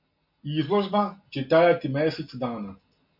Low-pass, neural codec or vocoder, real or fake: 5.4 kHz; none; real